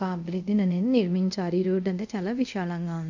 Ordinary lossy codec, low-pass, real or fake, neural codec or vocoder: none; 7.2 kHz; fake; codec, 24 kHz, 0.5 kbps, DualCodec